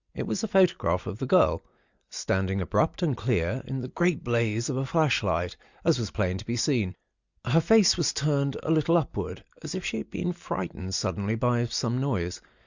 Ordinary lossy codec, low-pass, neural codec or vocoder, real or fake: Opus, 64 kbps; 7.2 kHz; none; real